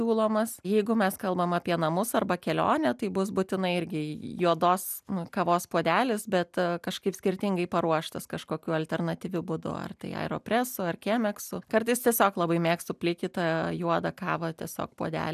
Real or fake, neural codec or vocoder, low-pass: real; none; 14.4 kHz